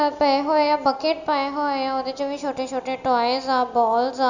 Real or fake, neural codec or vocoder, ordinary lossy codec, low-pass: real; none; none; 7.2 kHz